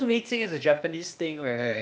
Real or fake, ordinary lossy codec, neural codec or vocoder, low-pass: fake; none; codec, 16 kHz, 0.8 kbps, ZipCodec; none